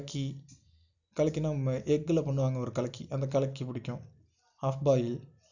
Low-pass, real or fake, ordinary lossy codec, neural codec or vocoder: 7.2 kHz; real; none; none